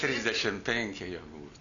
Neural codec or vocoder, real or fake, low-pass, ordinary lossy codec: none; real; 7.2 kHz; Opus, 64 kbps